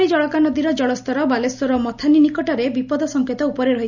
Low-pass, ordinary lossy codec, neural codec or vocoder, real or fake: 7.2 kHz; none; none; real